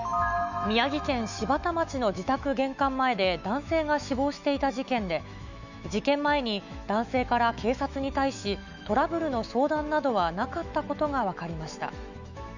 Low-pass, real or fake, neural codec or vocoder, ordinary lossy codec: 7.2 kHz; fake; autoencoder, 48 kHz, 128 numbers a frame, DAC-VAE, trained on Japanese speech; none